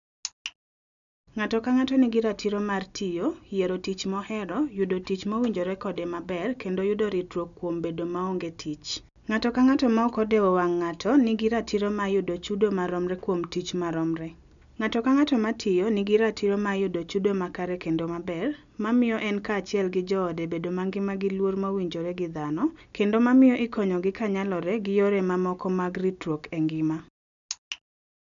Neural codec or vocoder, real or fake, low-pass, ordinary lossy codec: none; real; 7.2 kHz; none